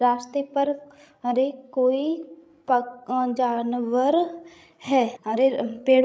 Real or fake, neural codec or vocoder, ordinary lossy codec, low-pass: fake; codec, 16 kHz, 16 kbps, FreqCodec, larger model; none; none